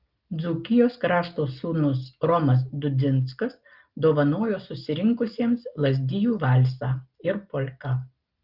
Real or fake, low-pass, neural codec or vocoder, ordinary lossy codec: real; 5.4 kHz; none; Opus, 32 kbps